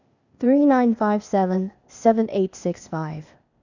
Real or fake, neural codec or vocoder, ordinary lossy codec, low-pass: fake; codec, 16 kHz, 0.8 kbps, ZipCodec; none; 7.2 kHz